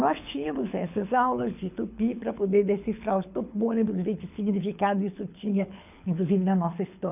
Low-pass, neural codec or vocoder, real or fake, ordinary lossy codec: 3.6 kHz; codec, 24 kHz, 6 kbps, HILCodec; fake; none